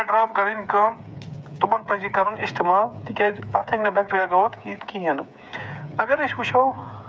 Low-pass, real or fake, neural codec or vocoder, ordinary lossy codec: none; fake; codec, 16 kHz, 8 kbps, FreqCodec, smaller model; none